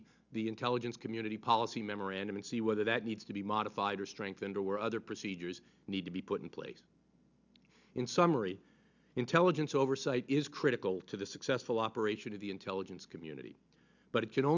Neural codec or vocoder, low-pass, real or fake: none; 7.2 kHz; real